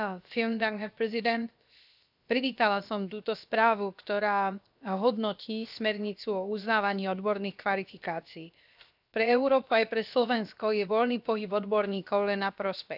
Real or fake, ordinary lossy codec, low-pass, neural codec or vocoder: fake; none; 5.4 kHz; codec, 16 kHz, 0.7 kbps, FocalCodec